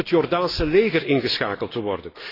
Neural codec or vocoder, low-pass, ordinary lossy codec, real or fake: none; 5.4 kHz; AAC, 24 kbps; real